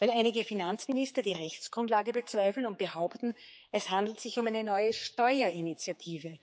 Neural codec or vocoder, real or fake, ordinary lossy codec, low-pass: codec, 16 kHz, 4 kbps, X-Codec, HuBERT features, trained on general audio; fake; none; none